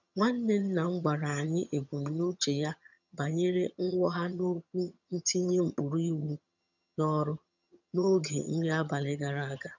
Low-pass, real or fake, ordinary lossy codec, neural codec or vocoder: 7.2 kHz; fake; none; vocoder, 22.05 kHz, 80 mel bands, HiFi-GAN